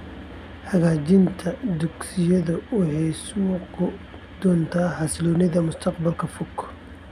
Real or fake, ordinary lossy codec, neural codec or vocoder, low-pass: real; none; none; 14.4 kHz